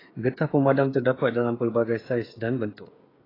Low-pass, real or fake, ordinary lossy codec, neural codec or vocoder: 5.4 kHz; fake; AAC, 24 kbps; codec, 16 kHz, 16 kbps, FreqCodec, smaller model